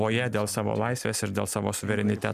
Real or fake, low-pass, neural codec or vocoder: real; 14.4 kHz; none